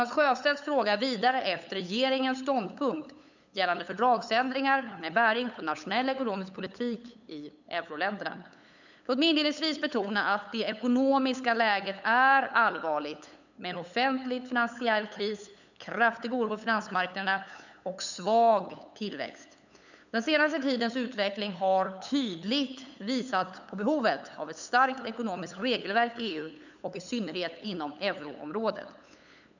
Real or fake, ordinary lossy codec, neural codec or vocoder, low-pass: fake; none; codec, 16 kHz, 8 kbps, FunCodec, trained on LibriTTS, 25 frames a second; 7.2 kHz